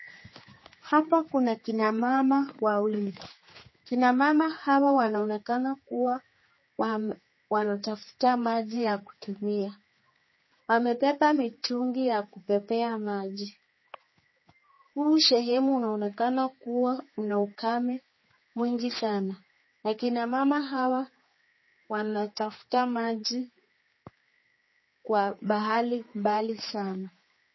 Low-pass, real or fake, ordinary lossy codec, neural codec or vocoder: 7.2 kHz; fake; MP3, 24 kbps; codec, 16 kHz, 4 kbps, X-Codec, HuBERT features, trained on general audio